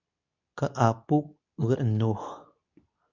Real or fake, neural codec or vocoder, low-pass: fake; codec, 24 kHz, 0.9 kbps, WavTokenizer, medium speech release version 2; 7.2 kHz